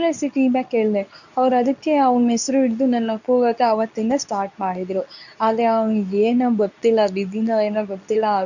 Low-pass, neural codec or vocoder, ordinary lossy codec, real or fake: 7.2 kHz; codec, 24 kHz, 0.9 kbps, WavTokenizer, medium speech release version 2; none; fake